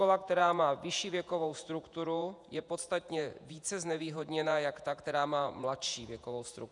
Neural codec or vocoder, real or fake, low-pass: vocoder, 48 kHz, 128 mel bands, Vocos; fake; 10.8 kHz